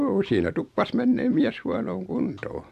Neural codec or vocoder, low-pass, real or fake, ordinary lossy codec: none; 14.4 kHz; real; AAC, 96 kbps